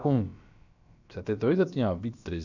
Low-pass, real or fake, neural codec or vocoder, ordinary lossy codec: 7.2 kHz; fake; codec, 16 kHz, about 1 kbps, DyCAST, with the encoder's durations; none